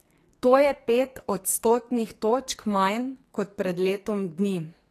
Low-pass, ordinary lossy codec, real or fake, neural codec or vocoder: 14.4 kHz; AAC, 48 kbps; fake; codec, 44.1 kHz, 2.6 kbps, SNAC